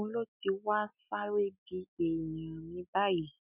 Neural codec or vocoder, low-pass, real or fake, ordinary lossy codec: none; 3.6 kHz; real; none